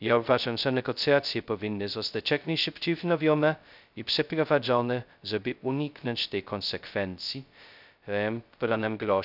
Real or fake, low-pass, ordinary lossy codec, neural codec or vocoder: fake; 5.4 kHz; none; codec, 16 kHz, 0.2 kbps, FocalCodec